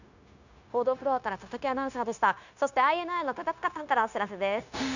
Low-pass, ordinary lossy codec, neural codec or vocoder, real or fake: 7.2 kHz; none; codec, 16 kHz, 0.9 kbps, LongCat-Audio-Codec; fake